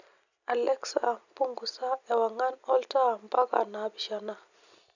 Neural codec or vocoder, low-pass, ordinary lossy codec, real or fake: none; 7.2 kHz; none; real